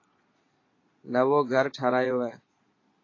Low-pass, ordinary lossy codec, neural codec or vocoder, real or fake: 7.2 kHz; AAC, 32 kbps; vocoder, 22.05 kHz, 80 mel bands, Vocos; fake